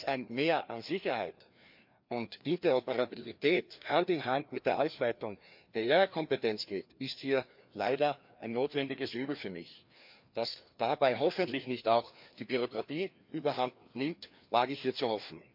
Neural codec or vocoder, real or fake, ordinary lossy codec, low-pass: codec, 16 kHz, 2 kbps, FreqCodec, larger model; fake; MP3, 48 kbps; 5.4 kHz